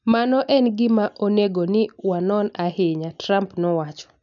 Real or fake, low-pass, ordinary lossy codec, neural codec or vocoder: real; 7.2 kHz; none; none